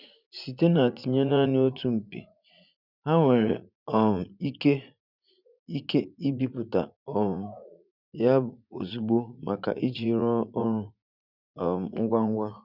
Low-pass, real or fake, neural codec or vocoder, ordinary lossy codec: 5.4 kHz; fake; vocoder, 44.1 kHz, 80 mel bands, Vocos; none